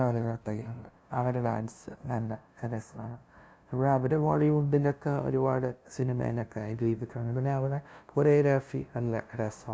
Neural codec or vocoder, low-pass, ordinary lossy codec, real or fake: codec, 16 kHz, 0.5 kbps, FunCodec, trained on LibriTTS, 25 frames a second; none; none; fake